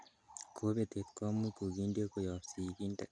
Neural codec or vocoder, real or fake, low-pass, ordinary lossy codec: none; real; 9.9 kHz; none